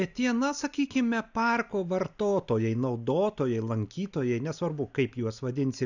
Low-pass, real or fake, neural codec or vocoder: 7.2 kHz; real; none